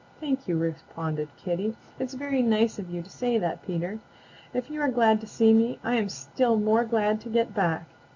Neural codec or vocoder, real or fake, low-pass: none; real; 7.2 kHz